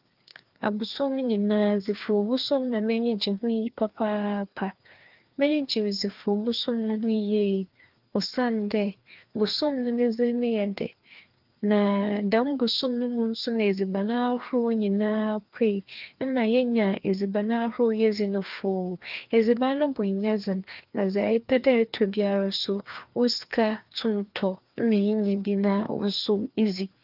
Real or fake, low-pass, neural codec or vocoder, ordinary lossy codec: fake; 5.4 kHz; codec, 16 kHz, 2 kbps, FreqCodec, larger model; Opus, 24 kbps